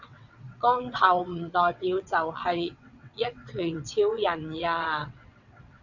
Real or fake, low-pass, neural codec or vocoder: fake; 7.2 kHz; vocoder, 22.05 kHz, 80 mel bands, WaveNeXt